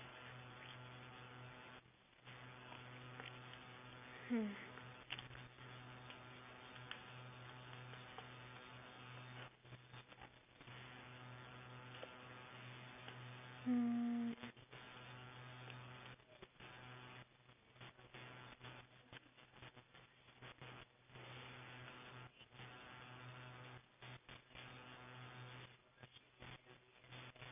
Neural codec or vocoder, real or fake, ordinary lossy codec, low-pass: none; real; none; 3.6 kHz